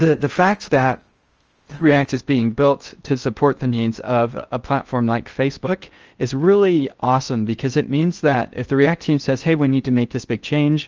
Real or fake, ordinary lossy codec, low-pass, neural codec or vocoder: fake; Opus, 24 kbps; 7.2 kHz; codec, 16 kHz in and 24 kHz out, 0.6 kbps, FocalCodec, streaming, 4096 codes